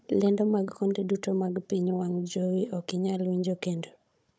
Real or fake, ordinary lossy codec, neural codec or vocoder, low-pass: fake; none; codec, 16 kHz, 16 kbps, FunCodec, trained on Chinese and English, 50 frames a second; none